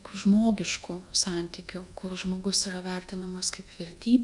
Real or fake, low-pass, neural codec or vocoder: fake; 10.8 kHz; codec, 24 kHz, 1.2 kbps, DualCodec